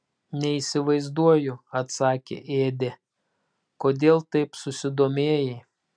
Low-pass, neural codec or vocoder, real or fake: 9.9 kHz; none; real